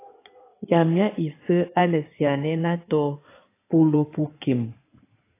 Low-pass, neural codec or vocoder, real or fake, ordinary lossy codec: 3.6 kHz; codec, 16 kHz in and 24 kHz out, 2.2 kbps, FireRedTTS-2 codec; fake; AAC, 24 kbps